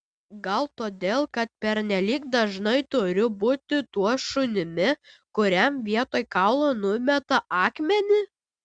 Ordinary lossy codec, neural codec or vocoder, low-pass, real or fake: Opus, 64 kbps; none; 9.9 kHz; real